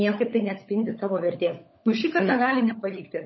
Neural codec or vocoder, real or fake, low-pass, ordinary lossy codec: codec, 16 kHz, 16 kbps, FunCodec, trained on LibriTTS, 50 frames a second; fake; 7.2 kHz; MP3, 24 kbps